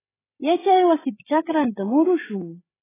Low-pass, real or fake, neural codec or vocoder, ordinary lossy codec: 3.6 kHz; fake; codec, 16 kHz, 8 kbps, FreqCodec, larger model; AAC, 16 kbps